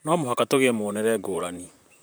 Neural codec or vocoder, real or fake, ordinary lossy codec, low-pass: vocoder, 44.1 kHz, 128 mel bands, Pupu-Vocoder; fake; none; none